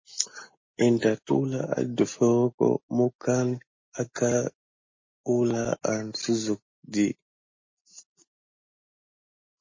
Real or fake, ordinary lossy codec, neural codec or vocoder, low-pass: real; MP3, 32 kbps; none; 7.2 kHz